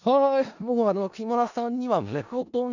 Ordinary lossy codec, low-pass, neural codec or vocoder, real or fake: none; 7.2 kHz; codec, 16 kHz in and 24 kHz out, 0.4 kbps, LongCat-Audio-Codec, four codebook decoder; fake